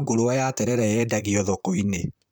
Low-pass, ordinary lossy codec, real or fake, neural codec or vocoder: none; none; fake; vocoder, 44.1 kHz, 128 mel bands, Pupu-Vocoder